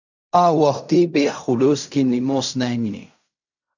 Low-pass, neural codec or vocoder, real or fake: 7.2 kHz; codec, 16 kHz in and 24 kHz out, 0.4 kbps, LongCat-Audio-Codec, fine tuned four codebook decoder; fake